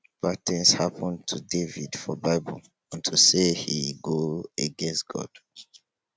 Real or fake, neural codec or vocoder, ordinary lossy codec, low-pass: real; none; none; none